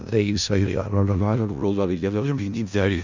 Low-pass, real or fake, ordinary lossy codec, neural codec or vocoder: 7.2 kHz; fake; Opus, 64 kbps; codec, 16 kHz in and 24 kHz out, 0.4 kbps, LongCat-Audio-Codec, four codebook decoder